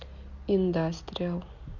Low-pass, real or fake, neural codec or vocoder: 7.2 kHz; real; none